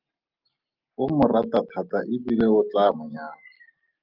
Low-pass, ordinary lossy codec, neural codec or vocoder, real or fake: 5.4 kHz; Opus, 24 kbps; none; real